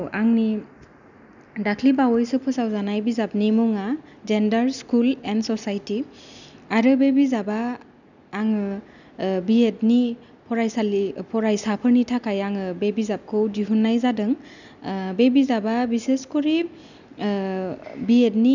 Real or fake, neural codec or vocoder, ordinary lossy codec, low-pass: real; none; none; 7.2 kHz